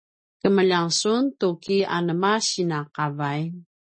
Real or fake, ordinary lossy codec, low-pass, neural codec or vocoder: real; MP3, 32 kbps; 9.9 kHz; none